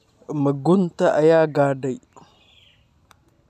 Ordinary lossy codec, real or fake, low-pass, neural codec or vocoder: none; real; 14.4 kHz; none